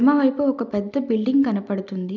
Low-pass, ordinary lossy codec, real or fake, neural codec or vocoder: 7.2 kHz; none; real; none